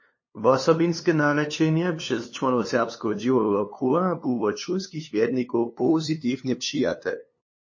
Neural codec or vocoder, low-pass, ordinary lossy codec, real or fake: codec, 16 kHz, 2 kbps, FunCodec, trained on LibriTTS, 25 frames a second; 7.2 kHz; MP3, 32 kbps; fake